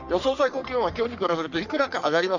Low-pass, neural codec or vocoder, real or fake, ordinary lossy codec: 7.2 kHz; codec, 44.1 kHz, 3.4 kbps, Pupu-Codec; fake; none